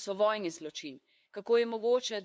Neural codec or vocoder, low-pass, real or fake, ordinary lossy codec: codec, 16 kHz, 2 kbps, FunCodec, trained on LibriTTS, 25 frames a second; none; fake; none